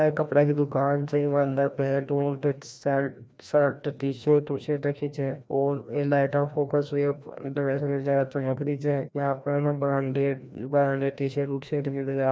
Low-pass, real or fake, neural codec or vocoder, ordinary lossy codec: none; fake; codec, 16 kHz, 1 kbps, FreqCodec, larger model; none